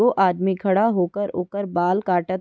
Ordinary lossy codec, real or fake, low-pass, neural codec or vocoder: none; real; none; none